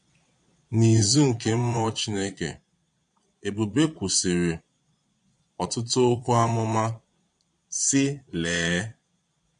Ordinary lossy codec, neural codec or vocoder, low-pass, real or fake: MP3, 48 kbps; vocoder, 22.05 kHz, 80 mel bands, Vocos; 9.9 kHz; fake